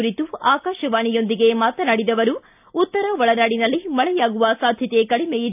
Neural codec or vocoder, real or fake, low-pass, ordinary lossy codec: none; real; 3.6 kHz; AAC, 32 kbps